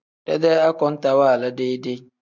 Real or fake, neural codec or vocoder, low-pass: real; none; 7.2 kHz